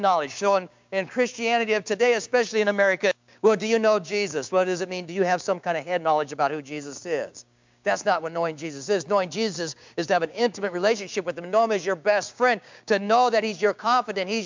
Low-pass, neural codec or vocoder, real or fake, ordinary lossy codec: 7.2 kHz; codec, 16 kHz, 6 kbps, DAC; fake; MP3, 64 kbps